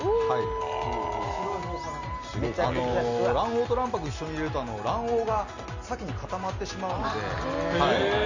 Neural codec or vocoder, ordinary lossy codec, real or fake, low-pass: none; Opus, 64 kbps; real; 7.2 kHz